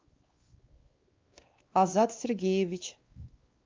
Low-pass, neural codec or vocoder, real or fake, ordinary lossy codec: 7.2 kHz; codec, 16 kHz, 2 kbps, X-Codec, WavLM features, trained on Multilingual LibriSpeech; fake; Opus, 32 kbps